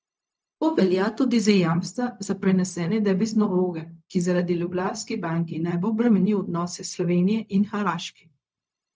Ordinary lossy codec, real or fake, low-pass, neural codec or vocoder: none; fake; none; codec, 16 kHz, 0.4 kbps, LongCat-Audio-Codec